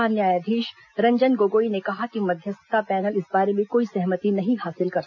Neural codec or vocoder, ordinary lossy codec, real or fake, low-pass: none; none; real; 7.2 kHz